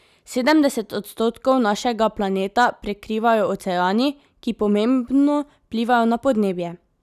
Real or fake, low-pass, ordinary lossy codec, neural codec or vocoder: real; 14.4 kHz; none; none